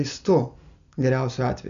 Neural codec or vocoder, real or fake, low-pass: none; real; 7.2 kHz